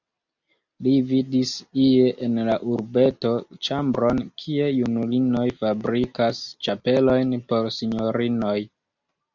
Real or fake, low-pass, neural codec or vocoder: real; 7.2 kHz; none